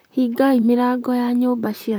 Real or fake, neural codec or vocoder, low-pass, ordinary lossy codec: fake; vocoder, 44.1 kHz, 128 mel bands, Pupu-Vocoder; none; none